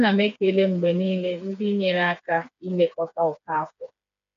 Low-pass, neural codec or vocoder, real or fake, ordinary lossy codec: 7.2 kHz; codec, 16 kHz, 4 kbps, FreqCodec, smaller model; fake; AAC, 64 kbps